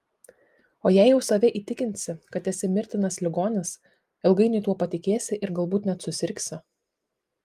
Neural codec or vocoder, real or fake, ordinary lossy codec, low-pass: none; real; Opus, 32 kbps; 14.4 kHz